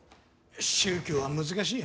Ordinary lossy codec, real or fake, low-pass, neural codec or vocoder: none; real; none; none